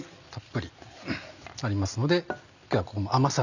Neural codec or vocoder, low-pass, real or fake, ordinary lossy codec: none; 7.2 kHz; real; none